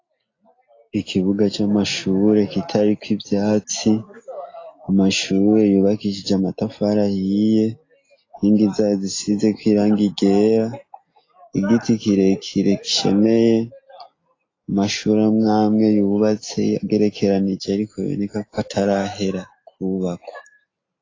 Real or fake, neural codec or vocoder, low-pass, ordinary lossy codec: real; none; 7.2 kHz; AAC, 32 kbps